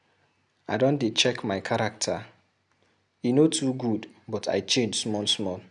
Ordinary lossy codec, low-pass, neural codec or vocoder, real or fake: none; 10.8 kHz; none; real